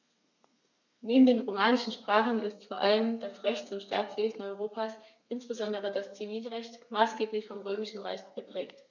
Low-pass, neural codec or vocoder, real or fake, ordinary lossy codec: 7.2 kHz; codec, 32 kHz, 1.9 kbps, SNAC; fake; none